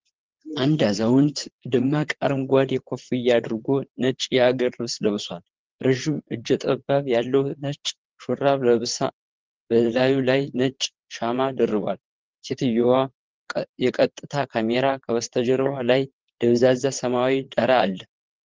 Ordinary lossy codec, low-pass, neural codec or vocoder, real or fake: Opus, 16 kbps; 7.2 kHz; vocoder, 22.05 kHz, 80 mel bands, WaveNeXt; fake